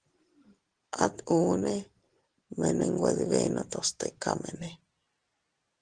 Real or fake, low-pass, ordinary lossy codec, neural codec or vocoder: real; 9.9 kHz; Opus, 16 kbps; none